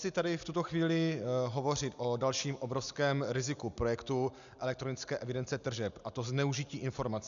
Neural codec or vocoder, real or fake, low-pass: none; real; 7.2 kHz